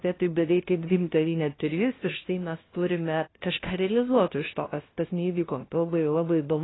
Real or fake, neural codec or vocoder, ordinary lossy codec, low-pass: fake; codec, 16 kHz, 0.5 kbps, FunCodec, trained on LibriTTS, 25 frames a second; AAC, 16 kbps; 7.2 kHz